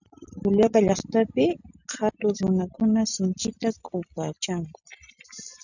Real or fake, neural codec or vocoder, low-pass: real; none; 7.2 kHz